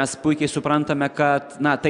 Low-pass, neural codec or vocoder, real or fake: 9.9 kHz; none; real